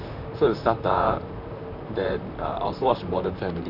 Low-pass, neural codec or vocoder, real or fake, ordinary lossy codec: 5.4 kHz; vocoder, 44.1 kHz, 128 mel bands, Pupu-Vocoder; fake; none